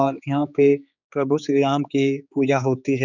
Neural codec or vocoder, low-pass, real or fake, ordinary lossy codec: codec, 16 kHz, 4 kbps, X-Codec, HuBERT features, trained on general audio; 7.2 kHz; fake; none